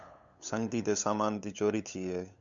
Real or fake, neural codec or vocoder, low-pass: fake; codec, 16 kHz, 8 kbps, FunCodec, trained on LibriTTS, 25 frames a second; 7.2 kHz